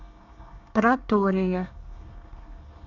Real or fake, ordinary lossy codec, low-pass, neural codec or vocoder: fake; none; 7.2 kHz; codec, 24 kHz, 1 kbps, SNAC